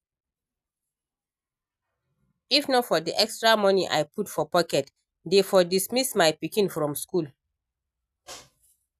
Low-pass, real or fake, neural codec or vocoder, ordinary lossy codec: 14.4 kHz; real; none; none